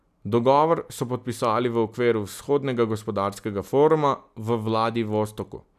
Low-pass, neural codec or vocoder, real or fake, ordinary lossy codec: 14.4 kHz; none; real; none